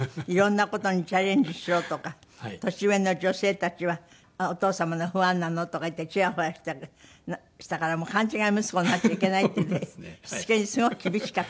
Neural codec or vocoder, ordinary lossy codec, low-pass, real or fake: none; none; none; real